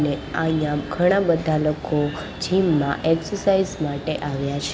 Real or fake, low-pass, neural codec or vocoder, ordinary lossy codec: real; none; none; none